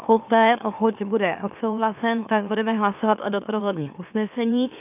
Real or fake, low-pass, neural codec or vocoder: fake; 3.6 kHz; autoencoder, 44.1 kHz, a latent of 192 numbers a frame, MeloTTS